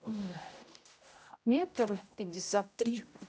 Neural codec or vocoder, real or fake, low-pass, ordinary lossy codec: codec, 16 kHz, 0.5 kbps, X-Codec, HuBERT features, trained on general audio; fake; none; none